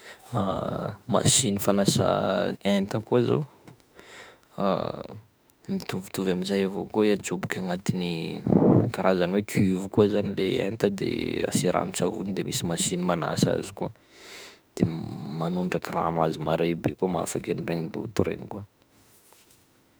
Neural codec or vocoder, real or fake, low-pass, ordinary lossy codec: autoencoder, 48 kHz, 32 numbers a frame, DAC-VAE, trained on Japanese speech; fake; none; none